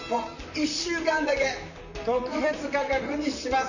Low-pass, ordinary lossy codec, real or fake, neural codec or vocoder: 7.2 kHz; none; fake; vocoder, 44.1 kHz, 128 mel bands, Pupu-Vocoder